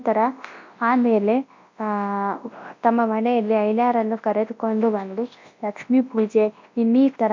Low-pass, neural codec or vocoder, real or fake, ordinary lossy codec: 7.2 kHz; codec, 24 kHz, 0.9 kbps, WavTokenizer, large speech release; fake; MP3, 48 kbps